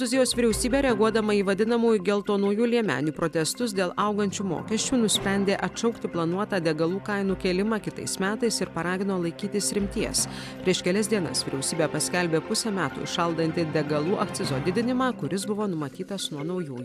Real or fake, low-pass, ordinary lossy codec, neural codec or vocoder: real; 14.4 kHz; AAC, 96 kbps; none